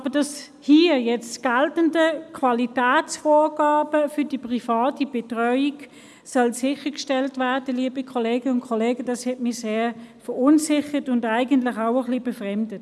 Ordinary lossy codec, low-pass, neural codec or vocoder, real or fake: none; none; none; real